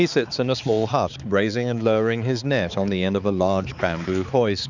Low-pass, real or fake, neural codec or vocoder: 7.2 kHz; fake; codec, 16 kHz, 4 kbps, X-Codec, HuBERT features, trained on balanced general audio